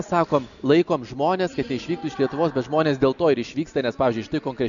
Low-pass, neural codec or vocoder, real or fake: 7.2 kHz; none; real